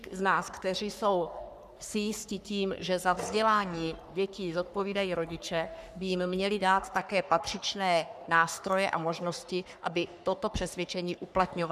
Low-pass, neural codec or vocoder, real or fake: 14.4 kHz; codec, 44.1 kHz, 3.4 kbps, Pupu-Codec; fake